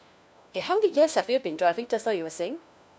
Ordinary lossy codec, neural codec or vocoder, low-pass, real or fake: none; codec, 16 kHz, 1 kbps, FunCodec, trained on LibriTTS, 50 frames a second; none; fake